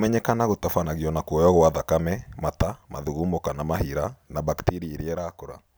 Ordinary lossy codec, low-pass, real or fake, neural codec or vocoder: none; none; real; none